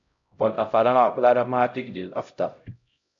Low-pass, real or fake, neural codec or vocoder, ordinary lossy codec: 7.2 kHz; fake; codec, 16 kHz, 0.5 kbps, X-Codec, HuBERT features, trained on LibriSpeech; AAC, 64 kbps